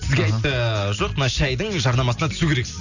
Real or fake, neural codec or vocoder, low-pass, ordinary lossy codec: real; none; 7.2 kHz; none